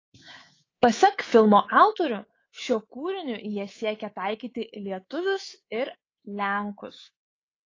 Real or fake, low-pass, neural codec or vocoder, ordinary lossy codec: fake; 7.2 kHz; codec, 24 kHz, 3.1 kbps, DualCodec; AAC, 32 kbps